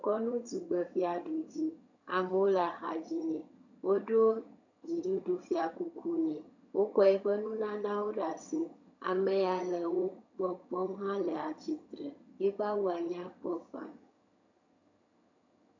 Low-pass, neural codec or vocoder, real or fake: 7.2 kHz; vocoder, 22.05 kHz, 80 mel bands, HiFi-GAN; fake